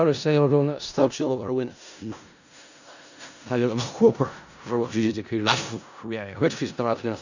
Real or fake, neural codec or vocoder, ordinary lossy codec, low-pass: fake; codec, 16 kHz in and 24 kHz out, 0.4 kbps, LongCat-Audio-Codec, four codebook decoder; none; 7.2 kHz